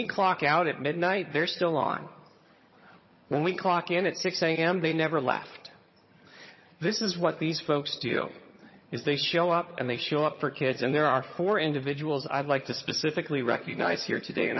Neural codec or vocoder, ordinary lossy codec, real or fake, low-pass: vocoder, 22.05 kHz, 80 mel bands, HiFi-GAN; MP3, 24 kbps; fake; 7.2 kHz